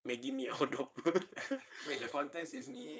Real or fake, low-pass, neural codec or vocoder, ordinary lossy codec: fake; none; codec, 16 kHz, 4.8 kbps, FACodec; none